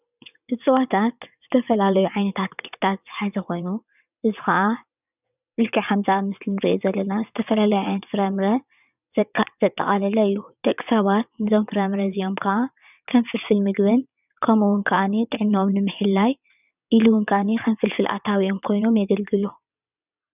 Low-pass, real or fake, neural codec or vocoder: 3.6 kHz; fake; vocoder, 22.05 kHz, 80 mel bands, WaveNeXt